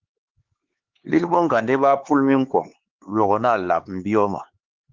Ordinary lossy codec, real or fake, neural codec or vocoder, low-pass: Opus, 16 kbps; fake; codec, 16 kHz, 4 kbps, X-Codec, HuBERT features, trained on LibriSpeech; 7.2 kHz